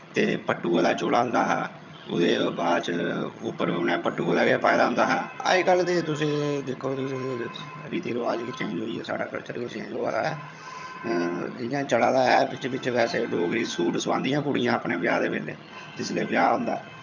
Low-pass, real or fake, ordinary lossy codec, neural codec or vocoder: 7.2 kHz; fake; none; vocoder, 22.05 kHz, 80 mel bands, HiFi-GAN